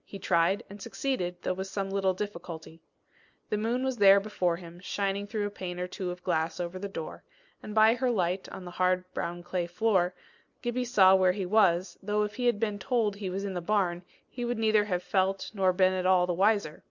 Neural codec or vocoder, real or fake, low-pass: none; real; 7.2 kHz